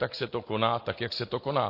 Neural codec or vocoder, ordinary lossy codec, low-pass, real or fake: none; MP3, 32 kbps; 5.4 kHz; real